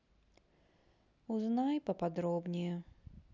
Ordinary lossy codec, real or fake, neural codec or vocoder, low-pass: none; real; none; 7.2 kHz